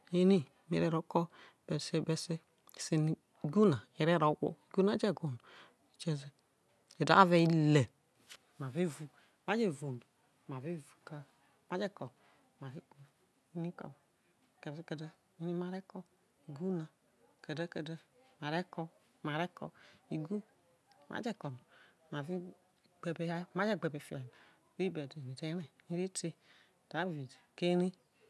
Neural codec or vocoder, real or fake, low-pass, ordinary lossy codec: none; real; none; none